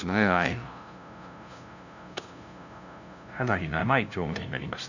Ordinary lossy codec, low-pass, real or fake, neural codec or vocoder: none; 7.2 kHz; fake; codec, 16 kHz, 0.5 kbps, FunCodec, trained on LibriTTS, 25 frames a second